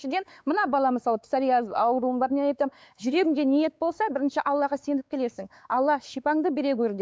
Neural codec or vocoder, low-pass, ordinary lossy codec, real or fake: codec, 16 kHz, 4 kbps, X-Codec, WavLM features, trained on Multilingual LibriSpeech; none; none; fake